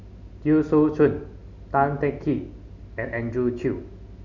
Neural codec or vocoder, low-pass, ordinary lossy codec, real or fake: none; 7.2 kHz; none; real